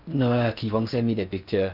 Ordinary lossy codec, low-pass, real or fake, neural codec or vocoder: none; 5.4 kHz; fake; codec, 16 kHz in and 24 kHz out, 0.6 kbps, FocalCodec, streaming, 4096 codes